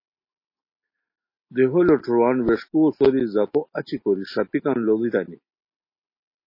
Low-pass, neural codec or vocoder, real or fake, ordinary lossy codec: 5.4 kHz; none; real; MP3, 24 kbps